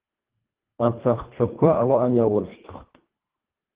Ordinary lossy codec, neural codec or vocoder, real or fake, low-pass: Opus, 16 kbps; codec, 24 kHz, 1.5 kbps, HILCodec; fake; 3.6 kHz